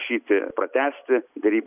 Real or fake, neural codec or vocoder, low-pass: real; none; 3.6 kHz